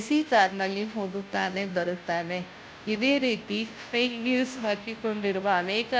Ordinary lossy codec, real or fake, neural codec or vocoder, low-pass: none; fake; codec, 16 kHz, 0.5 kbps, FunCodec, trained on Chinese and English, 25 frames a second; none